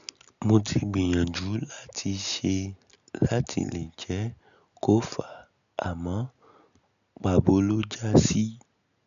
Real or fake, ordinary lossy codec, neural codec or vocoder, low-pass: real; none; none; 7.2 kHz